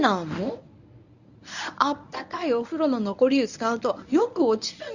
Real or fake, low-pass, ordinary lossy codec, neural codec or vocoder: fake; 7.2 kHz; none; codec, 24 kHz, 0.9 kbps, WavTokenizer, medium speech release version 1